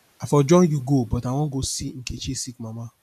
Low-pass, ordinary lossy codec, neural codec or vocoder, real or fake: 14.4 kHz; none; none; real